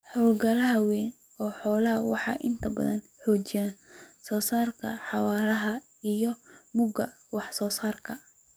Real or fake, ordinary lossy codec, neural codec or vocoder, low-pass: fake; none; codec, 44.1 kHz, 7.8 kbps, DAC; none